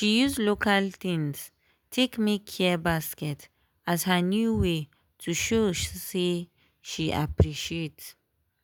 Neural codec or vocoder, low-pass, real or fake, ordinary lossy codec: none; none; real; none